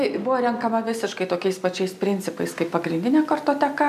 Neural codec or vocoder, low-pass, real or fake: none; 14.4 kHz; real